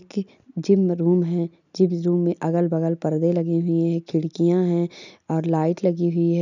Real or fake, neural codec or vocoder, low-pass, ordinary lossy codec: real; none; 7.2 kHz; none